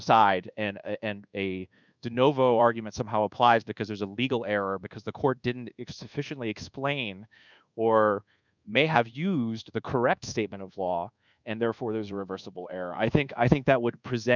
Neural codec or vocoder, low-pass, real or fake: codec, 24 kHz, 1.2 kbps, DualCodec; 7.2 kHz; fake